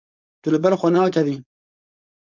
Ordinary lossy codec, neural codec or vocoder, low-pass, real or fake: MP3, 64 kbps; codec, 16 kHz, 4.8 kbps, FACodec; 7.2 kHz; fake